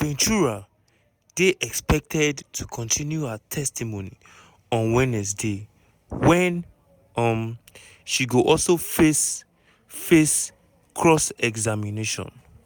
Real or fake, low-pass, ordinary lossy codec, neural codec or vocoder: real; none; none; none